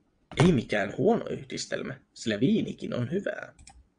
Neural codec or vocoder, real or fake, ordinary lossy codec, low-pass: vocoder, 22.05 kHz, 80 mel bands, WaveNeXt; fake; Opus, 64 kbps; 9.9 kHz